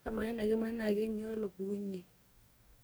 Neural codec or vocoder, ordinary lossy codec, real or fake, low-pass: codec, 44.1 kHz, 2.6 kbps, DAC; none; fake; none